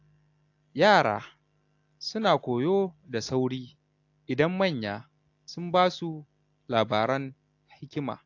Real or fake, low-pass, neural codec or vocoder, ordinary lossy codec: real; 7.2 kHz; none; AAC, 48 kbps